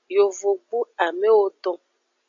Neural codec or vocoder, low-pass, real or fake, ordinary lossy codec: none; 7.2 kHz; real; AAC, 64 kbps